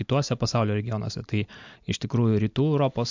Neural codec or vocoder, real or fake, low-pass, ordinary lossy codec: none; real; 7.2 kHz; MP3, 64 kbps